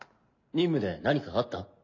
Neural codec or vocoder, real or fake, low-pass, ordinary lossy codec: none; real; 7.2 kHz; MP3, 64 kbps